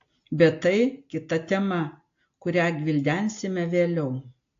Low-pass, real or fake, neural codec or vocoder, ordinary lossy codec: 7.2 kHz; real; none; AAC, 48 kbps